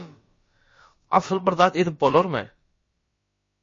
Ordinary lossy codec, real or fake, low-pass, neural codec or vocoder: MP3, 32 kbps; fake; 7.2 kHz; codec, 16 kHz, about 1 kbps, DyCAST, with the encoder's durations